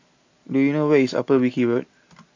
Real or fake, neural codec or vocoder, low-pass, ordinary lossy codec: real; none; 7.2 kHz; AAC, 48 kbps